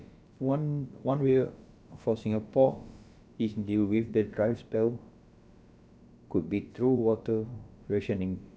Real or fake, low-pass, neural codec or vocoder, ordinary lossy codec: fake; none; codec, 16 kHz, about 1 kbps, DyCAST, with the encoder's durations; none